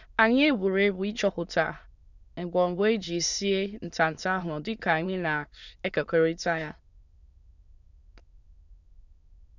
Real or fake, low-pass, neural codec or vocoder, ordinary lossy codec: fake; 7.2 kHz; autoencoder, 22.05 kHz, a latent of 192 numbers a frame, VITS, trained on many speakers; none